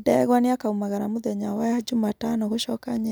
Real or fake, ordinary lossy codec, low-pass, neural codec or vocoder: real; none; none; none